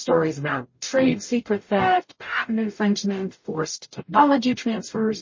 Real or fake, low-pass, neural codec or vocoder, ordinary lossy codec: fake; 7.2 kHz; codec, 44.1 kHz, 0.9 kbps, DAC; MP3, 32 kbps